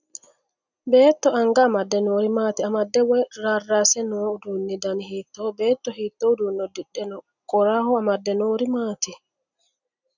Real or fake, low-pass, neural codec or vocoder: real; 7.2 kHz; none